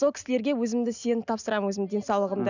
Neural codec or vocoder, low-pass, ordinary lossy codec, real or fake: none; 7.2 kHz; none; real